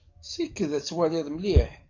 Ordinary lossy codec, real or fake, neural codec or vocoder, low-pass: AAC, 48 kbps; fake; codec, 44.1 kHz, 7.8 kbps, DAC; 7.2 kHz